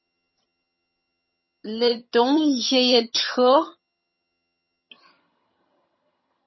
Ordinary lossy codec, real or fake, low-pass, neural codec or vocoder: MP3, 24 kbps; fake; 7.2 kHz; vocoder, 22.05 kHz, 80 mel bands, HiFi-GAN